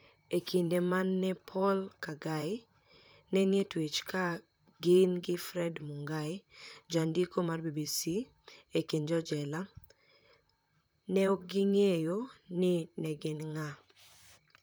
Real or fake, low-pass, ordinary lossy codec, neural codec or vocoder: fake; none; none; vocoder, 44.1 kHz, 128 mel bands, Pupu-Vocoder